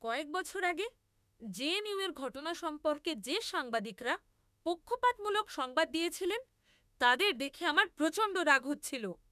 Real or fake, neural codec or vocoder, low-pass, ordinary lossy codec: fake; autoencoder, 48 kHz, 32 numbers a frame, DAC-VAE, trained on Japanese speech; 14.4 kHz; none